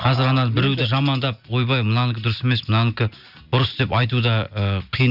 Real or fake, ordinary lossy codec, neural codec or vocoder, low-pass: real; none; none; 5.4 kHz